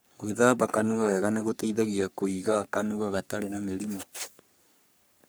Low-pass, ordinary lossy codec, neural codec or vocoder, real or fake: none; none; codec, 44.1 kHz, 3.4 kbps, Pupu-Codec; fake